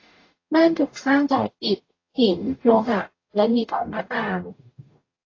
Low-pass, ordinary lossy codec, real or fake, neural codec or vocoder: 7.2 kHz; AAC, 32 kbps; fake; codec, 44.1 kHz, 0.9 kbps, DAC